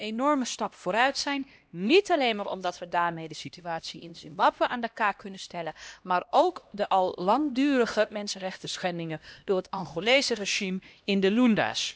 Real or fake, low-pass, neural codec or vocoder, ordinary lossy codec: fake; none; codec, 16 kHz, 1 kbps, X-Codec, HuBERT features, trained on LibriSpeech; none